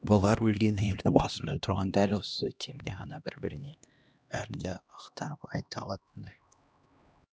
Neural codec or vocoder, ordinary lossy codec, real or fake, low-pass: codec, 16 kHz, 1 kbps, X-Codec, HuBERT features, trained on LibriSpeech; none; fake; none